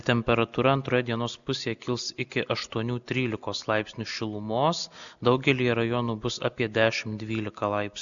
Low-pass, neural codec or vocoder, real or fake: 7.2 kHz; none; real